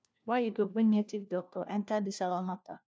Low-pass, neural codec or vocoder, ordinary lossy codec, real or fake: none; codec, 16 kHz, 1 kbps, FunCodec, trained on LibriTTS, 50 frames a second; none; fake